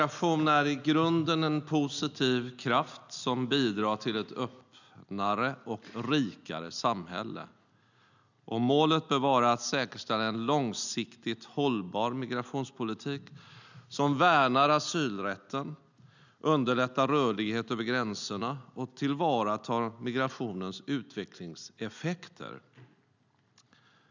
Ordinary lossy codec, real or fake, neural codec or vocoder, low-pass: none; real; none; 7.2 kHz